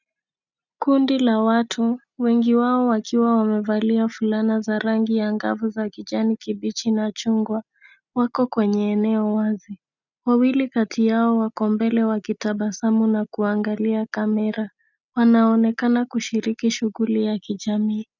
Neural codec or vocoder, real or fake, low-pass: none; real; 7.2 kHz